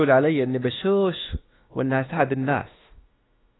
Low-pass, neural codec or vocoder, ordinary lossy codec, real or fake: 7.2 kHz; autoencoder, 48 kHz, 32 numbers a frame, DAC-VAE, trained on Japanese speech; AAC, 16 kbps; fake